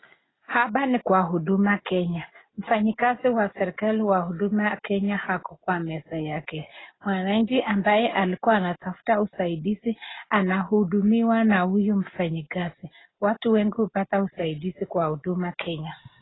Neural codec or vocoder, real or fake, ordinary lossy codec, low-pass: none; real; AAC, 16 kbps; 7.2 kHz